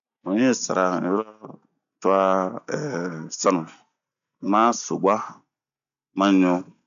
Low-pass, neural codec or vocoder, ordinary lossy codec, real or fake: 7.2 kHz; none; none; real